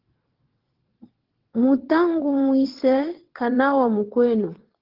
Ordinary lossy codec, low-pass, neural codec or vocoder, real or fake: Opus, 16 kbps; 5.4 kHz; vocoder, 22.05 kHz, 80 mel bands, WaveNeXt; fake